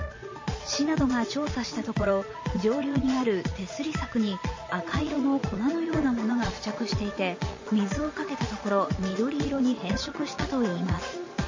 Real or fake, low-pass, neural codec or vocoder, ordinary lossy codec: fake; 7.2 kHz; vocoder, 22.05 kHz, 80 mel bands, Vocos; MP3, 32 kbps